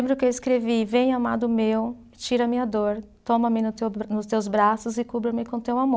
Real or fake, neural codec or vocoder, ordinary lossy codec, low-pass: real; none; none; none